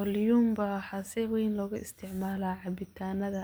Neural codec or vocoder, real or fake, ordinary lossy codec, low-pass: none; real; none; none